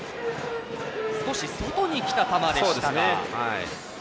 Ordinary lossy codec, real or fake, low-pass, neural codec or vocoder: none; real; none; none